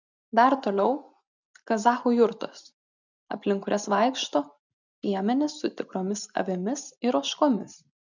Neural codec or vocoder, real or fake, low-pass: none; real; 7.2 kHz